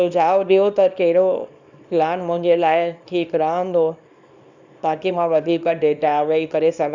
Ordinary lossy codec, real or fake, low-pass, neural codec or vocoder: none; fake; 7.2 kHz; codec, 24 kHz, 0.9 kbps, WavTokenizer, small release